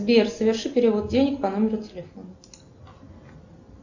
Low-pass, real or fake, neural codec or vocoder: 7.2 kHz; real; none